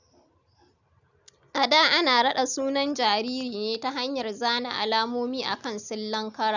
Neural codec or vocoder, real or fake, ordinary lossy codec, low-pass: none; real; none; 7.2 kHz